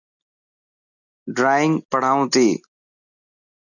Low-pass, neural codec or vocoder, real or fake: 7.2 kHz; none; real